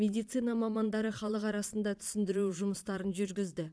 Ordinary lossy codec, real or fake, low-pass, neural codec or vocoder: none; fake; none; vocoder, 22.05 kHz, 80 mel bands, Vocos